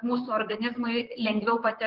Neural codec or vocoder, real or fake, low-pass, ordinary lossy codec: vocoder, 44.1 kHz, 128 mel bands, Pupu-Vocoder; fake; 5.4 kHz; Opus, 16 kbps